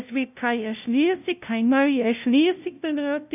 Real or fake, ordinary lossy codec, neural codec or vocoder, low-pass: fake; none; codec, 16 kHz, 0.5 kbps, FunCodec, trained on LibriTTS, 25 frames a second; 3.6 kHz